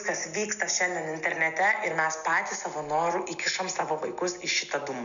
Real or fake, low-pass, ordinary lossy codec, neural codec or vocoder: real; 7.2 kHz; MP3, 96 kbps; none